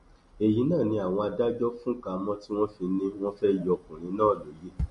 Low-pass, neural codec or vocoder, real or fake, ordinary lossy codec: 14.4 kHz; none; real; MP3, 48 kbps